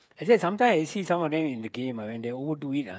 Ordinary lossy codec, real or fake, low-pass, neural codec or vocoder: none; fake; none; codec, 16 kHz, 8 kbps, FreqCodec, smaller model